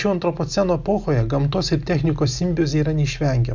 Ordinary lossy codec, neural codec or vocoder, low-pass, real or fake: Opus, 64 kbps; none; 7.2 kHz; real